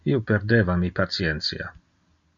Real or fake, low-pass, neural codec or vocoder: real; 7.2 kHz; none